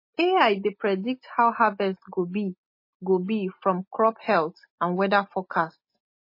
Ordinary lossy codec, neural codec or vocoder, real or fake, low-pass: MP3, 24 kbps; none; real; 5.4 kHz